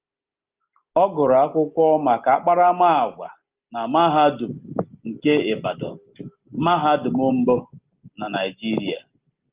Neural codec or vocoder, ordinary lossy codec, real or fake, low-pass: none; Opus, 32 kbps; real; 3.6 kHz